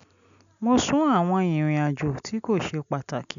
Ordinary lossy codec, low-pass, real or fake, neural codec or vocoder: none; 7.2 kHz; real; none